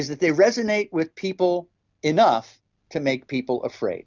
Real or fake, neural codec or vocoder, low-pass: real; none; 7.2 kHz